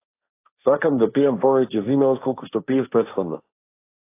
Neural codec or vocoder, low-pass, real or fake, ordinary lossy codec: codec, 16 kHz, 1.1 kbps, Voila-Tokenizer; 3.6 kHz; fake; AAC, 24 kbps